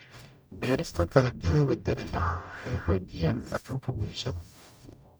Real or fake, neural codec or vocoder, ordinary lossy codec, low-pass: fake; codec, 44.1 kHz, 0.9 kbps, DAC; none; none